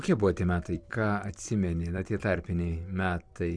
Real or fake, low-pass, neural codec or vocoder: fake; 9.9 kHz; vocoder, 44.1 kHz, 128 mel bands every 256 samples, BigVGAN v2